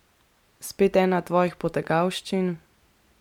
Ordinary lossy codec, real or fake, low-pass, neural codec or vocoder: MP3, 96 kbps; real; 19.8 kHz; none